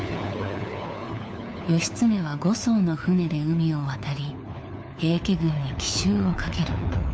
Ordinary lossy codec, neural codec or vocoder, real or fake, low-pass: none; codec, 16 kHz, 4 kbps, FunCodec, trained on LibriTTS, 50 frames a second; fake; none